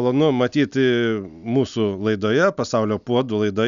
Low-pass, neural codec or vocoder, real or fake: 7.2 kHz; none; real